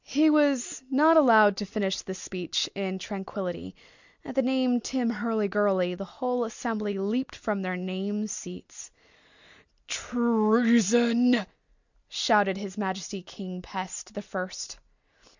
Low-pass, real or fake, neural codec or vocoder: 7.2 kHz; real; none